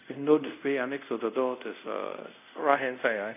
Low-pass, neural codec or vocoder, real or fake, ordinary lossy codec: 3.6 kHz; codec, 24 kHz, 0.5 kbps, DualCodec; fake; none